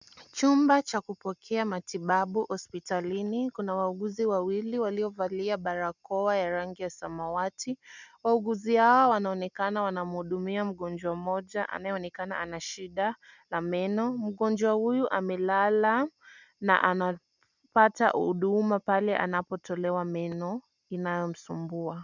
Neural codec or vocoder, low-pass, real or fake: none; 7.2 kHz; real